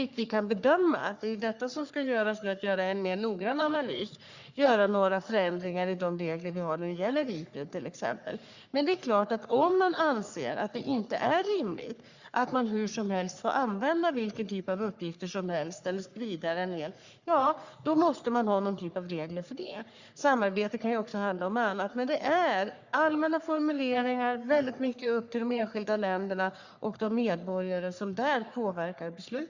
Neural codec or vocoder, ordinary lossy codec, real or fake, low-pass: codec, 44.1 kHz, 3.4 kbps, Pupu-Codec; Opus, 64 kbps; fake; 7.2 kHz